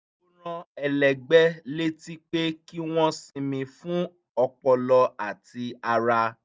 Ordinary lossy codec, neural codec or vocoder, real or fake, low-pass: none; none; real; none